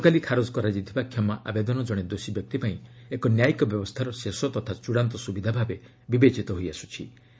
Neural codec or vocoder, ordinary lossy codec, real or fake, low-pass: none; none; real; none